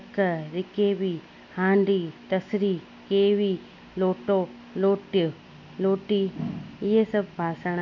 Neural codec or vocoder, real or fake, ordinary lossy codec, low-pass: none; real; none; 7.2 kHz